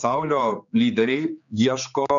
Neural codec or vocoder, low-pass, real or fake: codec, 16 kHz, 4 kbps, X-Codec, HuBERT features, trained on general audio; 7.2 kHz; fake